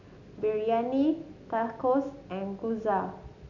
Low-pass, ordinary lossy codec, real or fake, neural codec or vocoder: 7.2 kHz; none; real; none